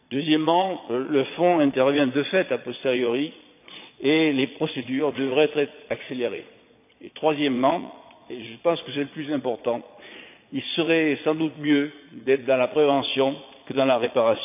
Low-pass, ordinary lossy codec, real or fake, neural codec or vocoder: 3.6 kHz; AAC, 32 kbps; fake; vocoder, 22.05 kHz, 80 mel bands, Vocos